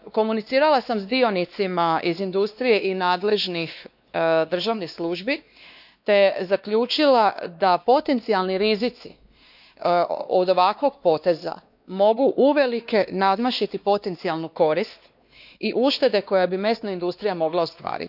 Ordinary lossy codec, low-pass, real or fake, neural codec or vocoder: none; 5.4 kHz; fake; codec, 16 kHz, 2 kbps, X-Codec, WavLM features, trained on Multilingual LibriSpeech